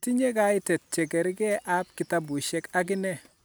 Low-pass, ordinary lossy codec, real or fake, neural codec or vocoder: none; none; real; none